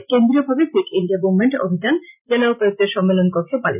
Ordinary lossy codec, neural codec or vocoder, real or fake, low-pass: none; none; real; 3.6 kHz